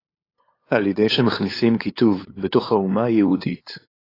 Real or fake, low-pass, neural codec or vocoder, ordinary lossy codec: fake; 5.4 kHz; codec, 16 kHz, 8 kbps, FunCodec, trained on LibriTTS, 25 frames a second; AAC, 24 kbps